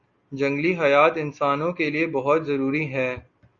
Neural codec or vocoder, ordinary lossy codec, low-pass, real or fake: none; Opus, 64 kbps; 7.2 kHz; real